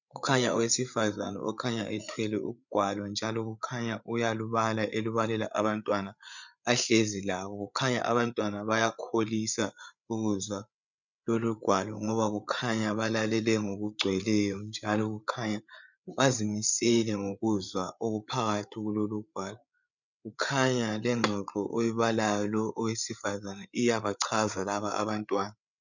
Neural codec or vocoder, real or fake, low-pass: codec, 16 kHz, 8 kbps, FreqCodec, larger model; fake; 7.2 kHz